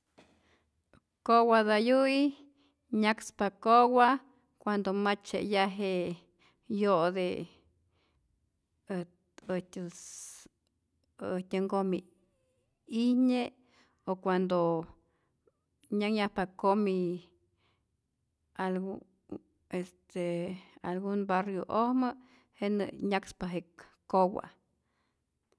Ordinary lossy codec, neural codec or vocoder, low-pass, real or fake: none; none; none; real